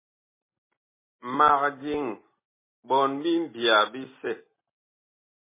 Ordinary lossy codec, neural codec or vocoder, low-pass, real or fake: MP3, 16 kbps; none; 3.6 kHz; real